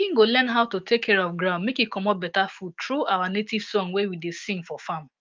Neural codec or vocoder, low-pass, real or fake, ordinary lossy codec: autoencoder, 48 kHz, 128 numbers a frame, DAC-VAE, trained on Japanese speech; 7.2 kHz; fake; Opus, 24 kbps